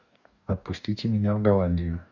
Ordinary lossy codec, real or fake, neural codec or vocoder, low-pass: none; fake; codec, 44.1 kHz, 2.6 kbps, DAC; 7.2 kHz